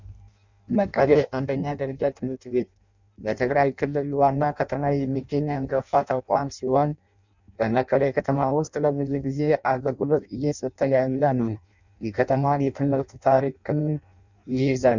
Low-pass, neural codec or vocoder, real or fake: 7.2 kHz; codec, 16 kHz in and 24 kHz out, 0.6 kbps, FireRedTTS-2 codec; fake